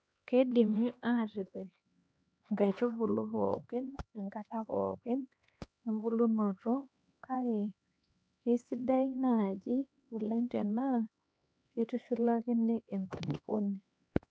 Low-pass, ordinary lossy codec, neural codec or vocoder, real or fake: none; none; codec, 16 kHz, 2 kbps, X-Codec, HuBERT features, trained on LibriSpeech; fake